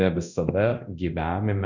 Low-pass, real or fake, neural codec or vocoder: 7.2 kHz; fake; codec, 24 kHz, 0.9 kbps, DualCodec